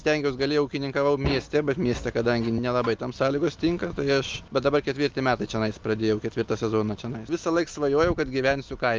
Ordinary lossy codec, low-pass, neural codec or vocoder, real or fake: Opus, 32 kbps; 7.2 kHz; none; real